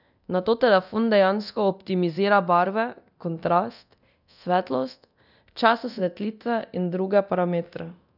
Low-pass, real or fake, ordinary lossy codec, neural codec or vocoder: 5.4 kHz; fake; none; codec, 24 kHz, 0.9 kbps, DualCodec